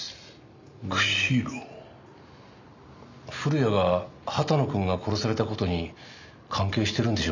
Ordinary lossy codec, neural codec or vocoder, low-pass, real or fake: none; none; 7.2 kHz; real